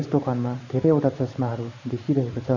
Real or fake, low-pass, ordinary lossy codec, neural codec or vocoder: fake; 7.2 kHz; MP3, 64 kbps; vocoder, 44.1 kHz, 128 mel bands every 512 samples, BigVGAN v2